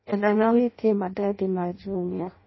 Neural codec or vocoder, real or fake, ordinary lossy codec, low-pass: codec, 16 kHz in and 24 kHz out, 0.6 kbps, FireRedTTS-2 codec; fake; MP3, 24 kbps; 7.2 kHz